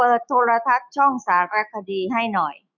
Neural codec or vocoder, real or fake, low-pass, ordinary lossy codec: autoencoder, 48 kHz, 128 numbers a frame, DAC-VAE, trained on Japanese speech; fake; 7.2 kHz; none